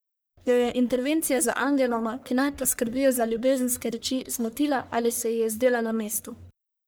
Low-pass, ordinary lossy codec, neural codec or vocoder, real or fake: none; none; codec, 44.1 kHz, 1.7 kbps, Pupu-Codec; fake